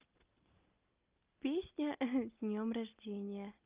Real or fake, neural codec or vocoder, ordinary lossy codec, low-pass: real; none; Opus, 32 kbps; 3.6 kHz